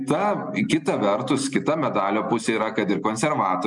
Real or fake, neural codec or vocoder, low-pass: real; none; 10.8 kHz